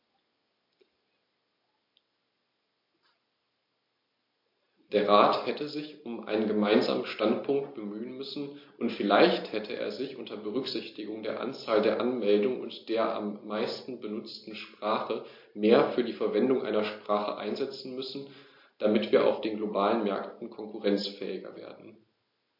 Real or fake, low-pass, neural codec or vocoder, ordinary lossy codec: real; 5.4 kHz; none; MP3, 32 kbps